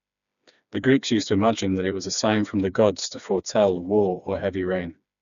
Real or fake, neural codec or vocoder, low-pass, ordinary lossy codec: fake; codec, 16 kHz, 2 kbps, FreqCodec, smaller model; 7.2 kHz; none